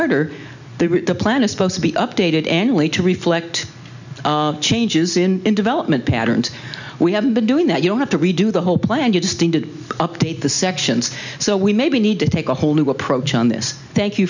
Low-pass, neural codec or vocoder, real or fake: 7.2 kHz; none; real